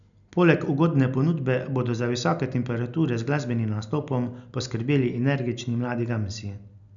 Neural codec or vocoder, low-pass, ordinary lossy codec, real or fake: none; 7.2 kHz; none; real